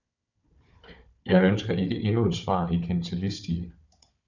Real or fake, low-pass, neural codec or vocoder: fake; 7.2 kHz; codec, 16 kHz, 4 kbps, FunCodec, trained on Chinese and English, 50 frames a second